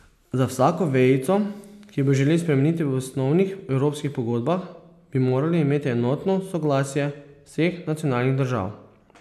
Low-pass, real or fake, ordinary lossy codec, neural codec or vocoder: 14.4 kHz; real; none; none